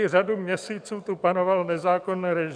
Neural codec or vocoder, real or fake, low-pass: codec, 44.1 kHz, 7.8 kbps, DAC; fake; 9.9 kHz